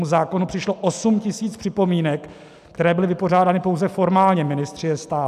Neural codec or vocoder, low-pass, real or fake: none; 14.4 kHz; real